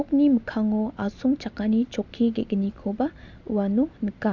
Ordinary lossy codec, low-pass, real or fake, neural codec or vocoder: Opus, 64 kbps; 7.2 kHz; real; none